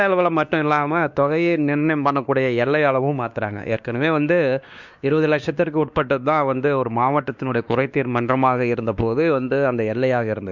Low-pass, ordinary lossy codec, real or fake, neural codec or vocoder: 7.2 kHz; none; fake; codec, 16 kHz, 2 kbps, X-Codec, WavLM features, trained on Multilingual LibriSpeech